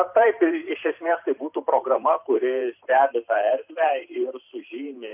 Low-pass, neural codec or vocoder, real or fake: 3.6 kHz; vocoder, 44.1 kHz, 128 mel bands every 512 samples, BigVGAN v2; fake